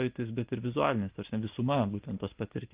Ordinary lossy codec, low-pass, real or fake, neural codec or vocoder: Opus, 32 kbps; 3.6 kHz; real; none